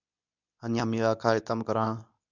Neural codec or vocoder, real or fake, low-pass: codec, 24 kHz, 0.9 kbps, WavTokenizer, medium speech release version 2; fake; 7.2 kHz